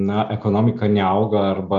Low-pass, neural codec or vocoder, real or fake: 7.2 kHz; none; real